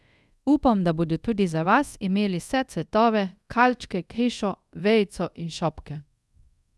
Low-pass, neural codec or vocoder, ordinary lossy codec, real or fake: none; codec, 24 kHz, 0.5 kbps, DualCodec; none; fake